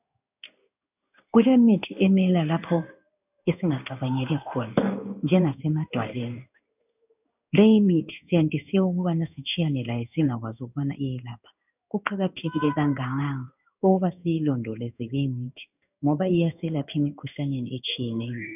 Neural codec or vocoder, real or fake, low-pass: codec, 16 kHz in and 24 kHz out, 1 kbps, XY-Tokenizer; fake; 3.6 kHz